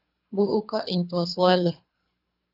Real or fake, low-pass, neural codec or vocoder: fake; 5.4 kHz; codec, 24 kHz, 3 kbps, HILCodec